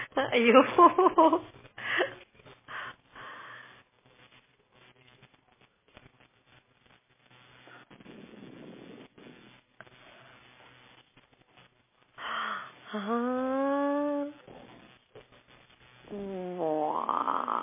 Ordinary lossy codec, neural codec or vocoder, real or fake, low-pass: MP3, 16 kbps; none; real; 3.6 kHz